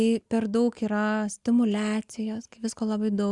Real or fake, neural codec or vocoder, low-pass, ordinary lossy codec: real; none; 10.8 kHz; Opus, 64 kbps